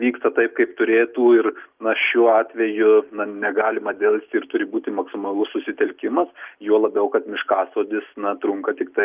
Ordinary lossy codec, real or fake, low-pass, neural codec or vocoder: Opus, 24 kbps; real; 3.6 kHz; none